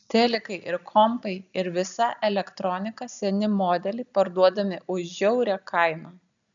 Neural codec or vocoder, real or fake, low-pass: none; real; 7.2 kHz